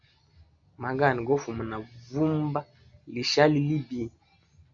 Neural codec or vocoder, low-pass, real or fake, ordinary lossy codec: none; 7.2 kHz; real; MP3, 96 kbps